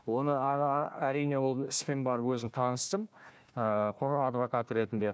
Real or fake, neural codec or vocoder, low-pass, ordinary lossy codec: fake; codec, 16 kHz, 1 kbps, FunCodec, trained on Chinese and English, 50 frames a second; none; none